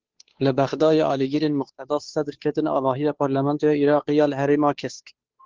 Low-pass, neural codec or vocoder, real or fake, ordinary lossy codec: 7.2 kHz; codec, 16 kHz, 2 kbps, FunCodec, trained on Chinese and English, 25 frames a second; fake; Opus, 16 kbps